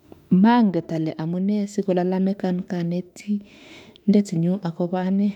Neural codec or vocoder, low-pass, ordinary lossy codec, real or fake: autoencoder, 48 kHz, 32 numbers a frame, DAC-VAE, trained on Japanese speech; 19.8 kHz; none; fake